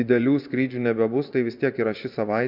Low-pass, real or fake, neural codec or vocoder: 5.4 kHz; fake; vocoder, 24 kHz, 100 mel bands, Vocos